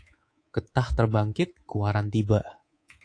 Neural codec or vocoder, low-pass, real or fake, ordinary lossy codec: codec, 24 kHz, 3.1 kbps, DualCodec; 9.9 kHz; fake; AAC, 48 kbps